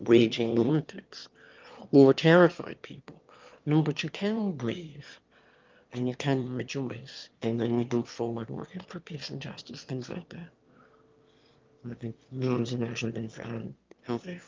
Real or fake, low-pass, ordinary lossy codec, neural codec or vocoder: fake; 7.2 kHz; Opus, 24 kbps; autoencoder, 22.05 kHz, a latent of 192 numbers a frame, VITS, trained on one speaker